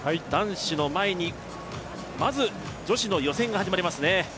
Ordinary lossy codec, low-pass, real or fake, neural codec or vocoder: none; none; real; none